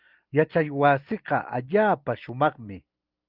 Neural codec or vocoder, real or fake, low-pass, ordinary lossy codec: none; real; 5.4 kHz; Opus, 24 kbps